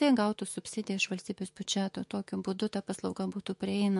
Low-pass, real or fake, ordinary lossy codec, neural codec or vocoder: 14.4 kHz; fake; MP3, 48 kbps; autoencoder, 48 kHz, 128 numbers a frame, DAC-VAE, trained on Japanese speech